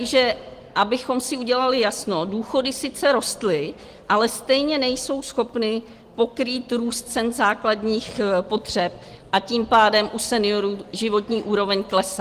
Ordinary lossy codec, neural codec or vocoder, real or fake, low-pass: Opus, 24 kbps; none; real; 14.4 kHz